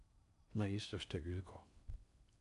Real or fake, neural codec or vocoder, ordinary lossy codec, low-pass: fake; codec, 16 kHz in and 24 kHz out, 0.6 kbps, FocalCodec, streaming, 4096 codes; none; 10.8 kHz